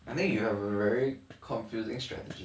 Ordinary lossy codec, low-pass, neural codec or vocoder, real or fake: none; none; none; real